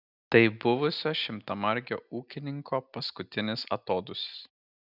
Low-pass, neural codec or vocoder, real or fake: 5.4 kHz; none; real